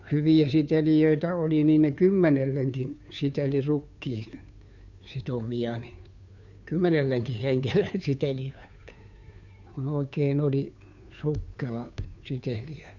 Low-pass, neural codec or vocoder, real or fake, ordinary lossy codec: 7.2 kHz; codec, 16 kHz, 2 kbps, FunCodec, trained on Chinese and English, 25 frames a second; fake; none